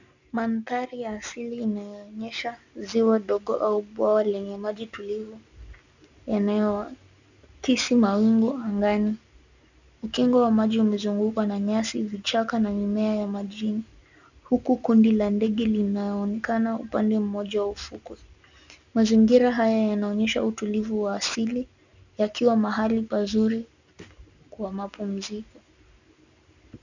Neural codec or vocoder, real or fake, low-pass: none; real; 7.2 kHz